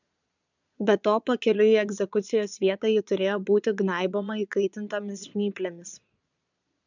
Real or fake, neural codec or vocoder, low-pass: fake; vocoder, 22.05 kHz, 80 mel bands, Vocos; 7.2 kHz